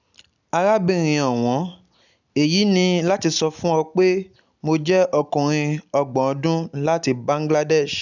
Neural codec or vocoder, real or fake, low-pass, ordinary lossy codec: none; real; 7.2 kHz; none